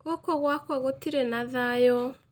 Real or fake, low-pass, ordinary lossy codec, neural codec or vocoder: real; 19.8 kHz; Opus, 32 kbps; none